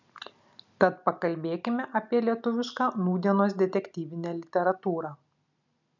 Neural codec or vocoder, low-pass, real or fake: none; 7.2 kHz; real